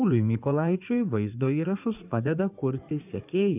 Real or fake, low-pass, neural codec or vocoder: fake; 3.6 kHz; codec, 16 kHz in and 24 kHz out, 2.2 kbps, FireRedTTS-2 codec